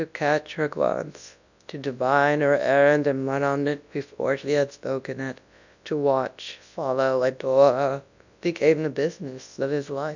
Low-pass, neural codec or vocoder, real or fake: 7.2 kHz; codec, 24 kHz, 0.9 kbps, WavTokenizer, large speech release; fake